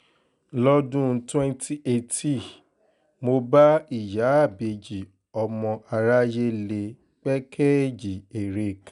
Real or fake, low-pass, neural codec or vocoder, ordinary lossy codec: real; 10.8 kHz; none; none